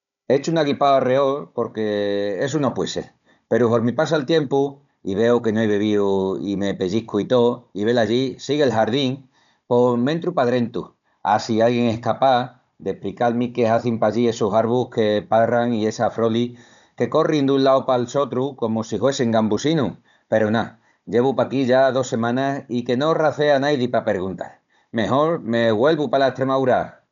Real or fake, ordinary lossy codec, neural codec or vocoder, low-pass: fake; none; codec, 16 kHz, 16 kbps, FunCodec, trained on Chinese and English, 50 frames a second; 7.2 kHz